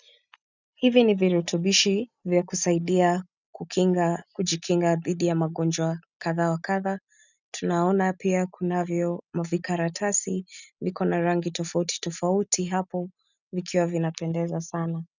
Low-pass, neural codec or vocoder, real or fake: 7.2 kHz; none; real